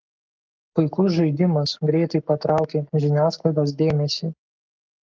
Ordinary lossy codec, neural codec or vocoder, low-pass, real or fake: Opus, 32 kbps; codec, 44.1 kHz, 7.8 kbps, Pupu-Codec; 7.2 kHz; fake